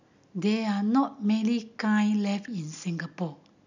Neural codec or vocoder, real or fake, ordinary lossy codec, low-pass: none; real; none; 7.2 kHz